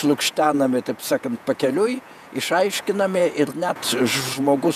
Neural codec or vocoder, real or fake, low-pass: vocoder, 48 kHz, 128 mel bands, Vocos; fake; 14.4 kHz